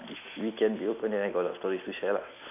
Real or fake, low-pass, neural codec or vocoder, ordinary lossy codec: real; 3.6 kHz; none; MP3, 32 kbps